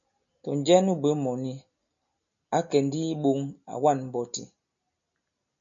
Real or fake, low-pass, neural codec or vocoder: real; 7.2 kHz; none